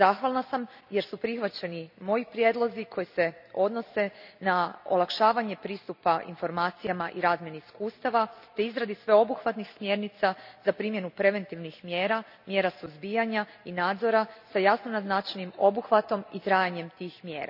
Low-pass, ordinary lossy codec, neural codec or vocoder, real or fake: 5.4 kHz; none; none; real